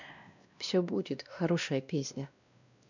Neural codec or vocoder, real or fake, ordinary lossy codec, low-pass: codec, 16 kHz, 1 kbps, X-Codec, WavLM features, trained on Multilingual LibriSpeech; fake; none; 7.2 kHz